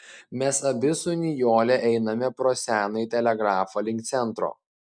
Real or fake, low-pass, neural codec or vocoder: real; 9.9 kHz; none